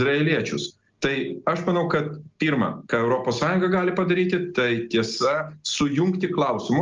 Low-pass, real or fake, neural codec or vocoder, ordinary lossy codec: 7.2 kHz; real; none; Opus, 24 kbps